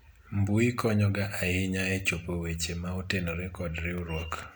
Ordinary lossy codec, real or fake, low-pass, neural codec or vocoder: none; real; none; none